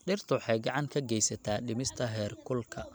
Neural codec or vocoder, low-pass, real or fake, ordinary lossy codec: none; none; real; none